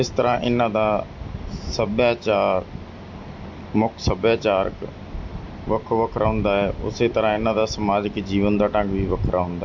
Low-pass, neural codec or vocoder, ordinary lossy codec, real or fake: 7.2 kHz; none; MP3, 48 kbps; real